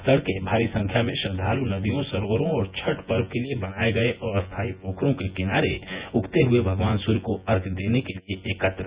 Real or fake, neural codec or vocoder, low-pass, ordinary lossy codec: fake; vocoder, 24 kHz, 100 mel bands, Vocos; 3.6 kHz; Opus, 64 kbps